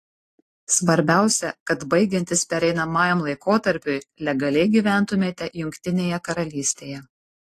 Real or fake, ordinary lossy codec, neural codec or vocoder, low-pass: real; AAC, 48 kbps; none; 14.4 kHz